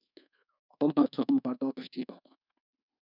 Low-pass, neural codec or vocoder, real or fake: 5.4 kHz; codec, 24 kHz, 1.2 kbps, DualCodec; fake